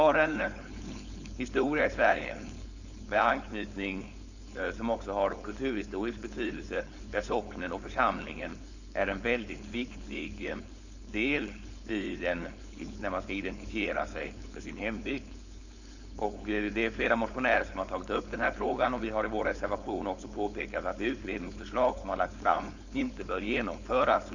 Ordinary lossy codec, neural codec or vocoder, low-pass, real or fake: none; codec, 16 kHz, 4.8 kbps, FACodec; 7.2 kHz; fake